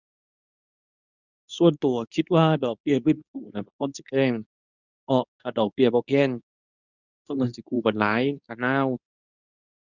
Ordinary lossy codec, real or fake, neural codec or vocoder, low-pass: none; fake; codec, 24 kHz, 0.9 kbps, WavTokenizer, medium speech release version 2; 7.2 kHz